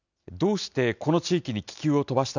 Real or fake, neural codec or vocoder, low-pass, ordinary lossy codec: real; none; 7.2 kHz; none